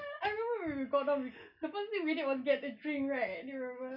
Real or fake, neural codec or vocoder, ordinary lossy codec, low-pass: real; none; none; 5.4 kHz